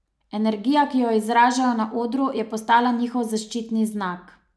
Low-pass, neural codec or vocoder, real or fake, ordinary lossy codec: none; none; real; none